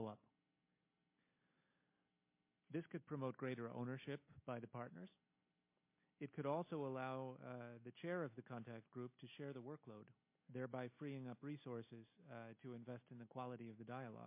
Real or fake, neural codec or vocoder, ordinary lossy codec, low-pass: real; none; MP3, 24 kbps; 3.6 kHz